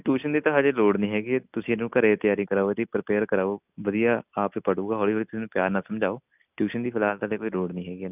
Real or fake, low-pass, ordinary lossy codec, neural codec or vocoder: real; 3.6 kHz; none; none